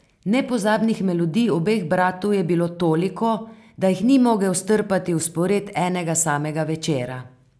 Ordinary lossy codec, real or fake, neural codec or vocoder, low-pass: none; real; none; none